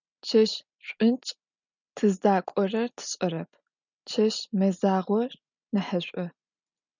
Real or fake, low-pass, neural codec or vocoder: real; 7.2 kHz; none